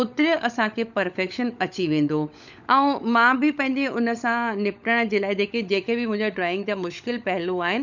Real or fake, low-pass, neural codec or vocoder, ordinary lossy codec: fake; 7.2 kHz; codec, 16 kHz, 16 kbps, FunCodec, trained on LibriTTS, 50 frames a second; none